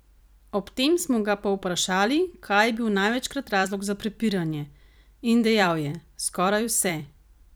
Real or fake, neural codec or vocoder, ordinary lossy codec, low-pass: fake; vocoder, 44.1 kHz, 128 mel bands every 256 samples, BigVGAN v2; none; none